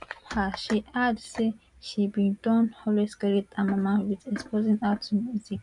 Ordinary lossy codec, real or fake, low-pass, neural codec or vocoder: none; real; 10.8 kHz; none